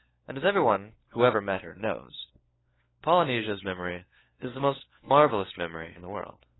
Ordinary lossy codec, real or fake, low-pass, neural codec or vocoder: AAC, 16 kbps; fake; 7.2 kHz; codec, 16 kHz, 4 kbps, FunCodec, trained on LibriTTS, 50 frames a second